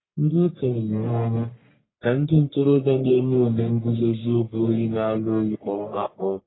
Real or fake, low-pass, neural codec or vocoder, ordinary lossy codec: fake; 7.2 kHz; codec, 44.1 kHz, 1.7 kbps, Pupu-Codec; AAC, 16 kbps